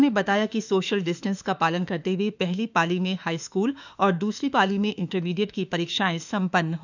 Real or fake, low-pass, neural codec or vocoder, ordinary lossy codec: fake; 7.2 kHz; autoencoder, 48 kHz, 32 numbers a frame, DAC-VAE, trained on Japanese speech; none